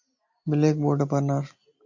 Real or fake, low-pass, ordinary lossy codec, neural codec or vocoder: real; 7.2 kHz; MP3, 48 kbps; none